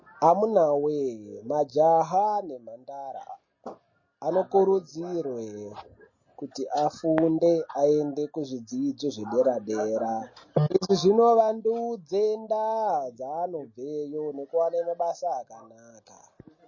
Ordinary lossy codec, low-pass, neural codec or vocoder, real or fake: MP3, 32 kbps; 7.2 kHz; none; real